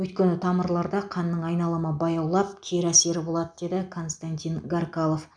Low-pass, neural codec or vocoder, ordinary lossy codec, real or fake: 9.9 kHz; none; none; real